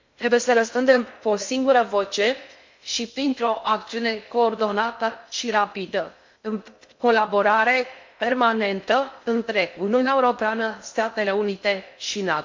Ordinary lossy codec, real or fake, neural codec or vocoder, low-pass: MP3, 48 kbps; fake; codec, 16 kHz in and 24 kHz out, 0.6 kbps, FocalCodec, streaming, 2048 codes; 7.2 kHz